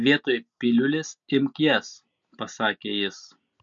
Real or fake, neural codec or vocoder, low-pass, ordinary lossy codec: real; none; 7.2 kHz; MP3, 48 kbps